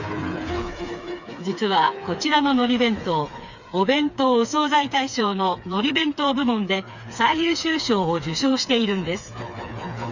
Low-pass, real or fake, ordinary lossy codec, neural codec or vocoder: 7.2 kHz; fake; none; codec, 16 kHz, 4 kbps, FreqCodec, smaller model